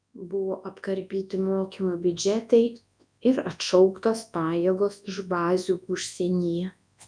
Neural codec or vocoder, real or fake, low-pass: codec, 24 kHz, 0.9 kbps, WavTokenizer, large speech release; fake; 9.9 kHz